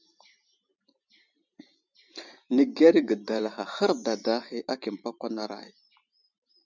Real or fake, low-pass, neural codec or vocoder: fake; 7.2 kHz; vocoder, 44.1 kHz, 128 mel bands every 512 samples, BigVGAN v2